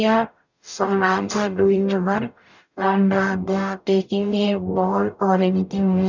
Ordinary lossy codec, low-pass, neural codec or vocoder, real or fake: none; 7.2 kHz; codec, 44.1 kHz, 0.9 kbps, DAC; fake